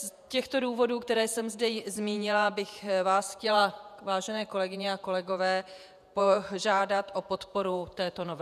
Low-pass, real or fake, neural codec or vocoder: 14.4 kHz; fake; vocoder, 48 kHz, 128 mel bands, Vocos